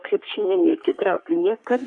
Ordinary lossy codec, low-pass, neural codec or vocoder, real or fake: AAC, 64 kbps; 10.8 kHz; codec, 44.1 kHz, 3.4 kbps, Pupu-Codec; fake